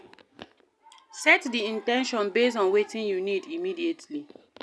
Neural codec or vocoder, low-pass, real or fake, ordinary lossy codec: none; none; real; none